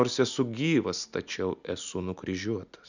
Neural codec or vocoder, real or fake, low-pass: none; real; 7.2 kHz